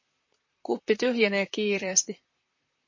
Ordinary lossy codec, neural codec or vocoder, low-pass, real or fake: MP3, 32 kbps; vocoder, 44.1 kHz, 128 mel bands, Pupu-Vocoder; 7.2 kHz; fake